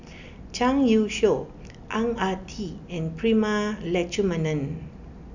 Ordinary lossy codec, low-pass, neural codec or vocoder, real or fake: none; 7.2 kHz; none; real